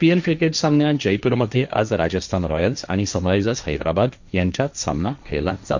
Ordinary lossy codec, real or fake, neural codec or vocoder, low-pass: none; fake; codec, 16 kHz, 1.1 kbps, Voila-Tokenizer; 7.2 kHz